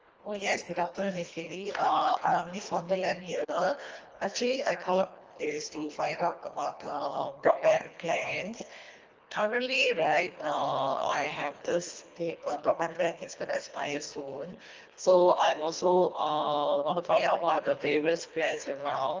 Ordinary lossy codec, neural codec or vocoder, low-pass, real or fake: Opus, 24 kbps; codec, 24 kHz, 1.5 kbps, HILCodec; 7.2 kHz; fake